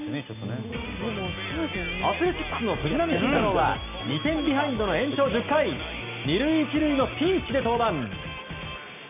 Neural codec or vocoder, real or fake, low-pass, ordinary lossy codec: none; real; 3.6 kHz; none